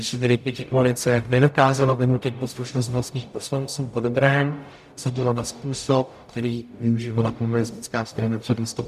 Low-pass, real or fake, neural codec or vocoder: 14.4 kHz; fake; codec, 44.1 kHz, 0.9 kbps, DAC